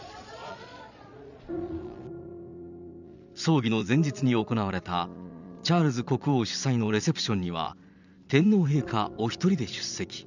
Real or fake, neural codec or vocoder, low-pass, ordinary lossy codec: fake; vocoder, 22.05 kHz, 80 mel bands, Vocos; 7.2 kHz; none